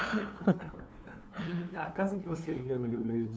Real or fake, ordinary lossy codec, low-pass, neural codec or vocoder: fake; none; none; codec, 16 kHz, 2 kbps, FunCodec, trained on LibriTTS, 25 frames a second